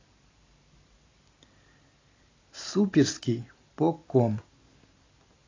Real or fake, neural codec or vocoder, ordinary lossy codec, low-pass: fake; vocoder, 44.1 kHz, 128 mel bands every 512 samples, BigVGAN v2; AAC, 32 kbps; 7.2 kHz